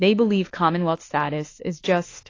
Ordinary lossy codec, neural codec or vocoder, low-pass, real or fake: AAC, 32 kbps; autoencoder, 48 kHz, 32 numbers a frame, DAC-VAE, trained on Japanese speech; 7.2 kHz; fake